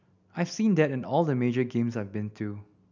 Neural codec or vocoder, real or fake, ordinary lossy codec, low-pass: none; real; none; 7.2 kHz